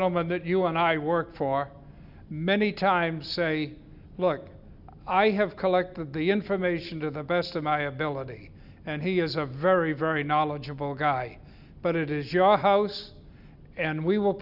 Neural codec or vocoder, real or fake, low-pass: none; real; 5.4 kHz